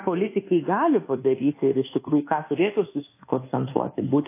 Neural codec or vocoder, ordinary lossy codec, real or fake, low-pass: codec, 24 kHz, 1.2 kbps, DualCodec; AAC, 24 kbps; fake; 3.6 kHz